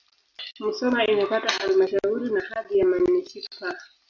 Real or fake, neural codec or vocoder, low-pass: real; none; 7.2 kHz